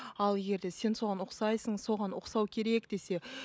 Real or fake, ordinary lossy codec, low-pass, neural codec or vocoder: real; none; none; none